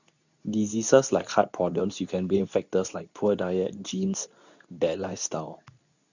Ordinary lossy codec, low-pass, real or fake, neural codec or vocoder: none; 7.2 kHz; fake; codec, 24 kHz, 0.9 kbps, WavTokenizer, medium speech release version 2